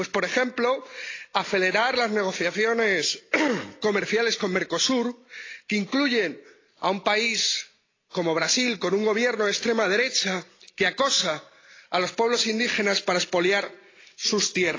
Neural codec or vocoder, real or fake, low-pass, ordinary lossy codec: none; real; 7.2 kHz; AAC, 32 kbps